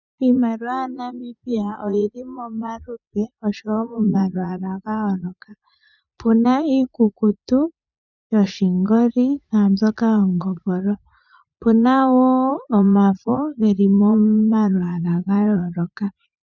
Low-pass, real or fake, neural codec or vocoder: 7.2 kHz; fake; vocoder, 44.1 kHz, 80 mel bands, Vocos